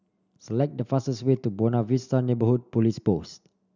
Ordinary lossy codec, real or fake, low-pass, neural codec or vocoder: none; real; 7.2 kHz; none